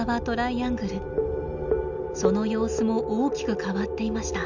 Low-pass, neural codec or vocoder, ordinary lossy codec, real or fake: 7.2 kHz; none; none; real